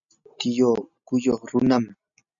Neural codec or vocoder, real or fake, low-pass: none; real; 7.2 kHz